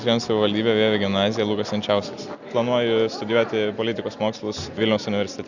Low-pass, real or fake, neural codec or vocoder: 7.2 kHz; real; none